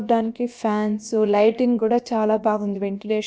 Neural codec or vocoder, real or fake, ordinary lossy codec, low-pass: codec, 16 kHz, about 1 kbps, DyCAST, with the encoder's durations; fake; none; none